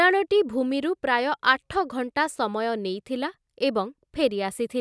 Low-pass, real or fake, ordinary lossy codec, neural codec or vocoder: none; real; none; none